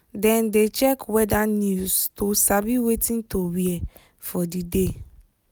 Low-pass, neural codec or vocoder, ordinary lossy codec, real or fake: none; none; none; real